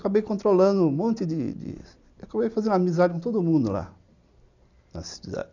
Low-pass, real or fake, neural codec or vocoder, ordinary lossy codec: 7.2 kHz; real; none; none